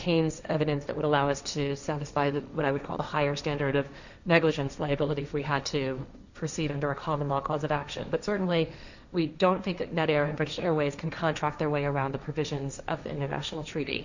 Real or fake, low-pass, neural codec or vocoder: fake; 7.2 kHz; codec, 16 kHz, 1.1 kbps, Voila-Tokenizer